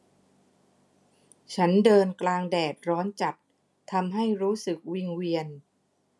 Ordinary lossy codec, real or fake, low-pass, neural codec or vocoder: none; real; none; none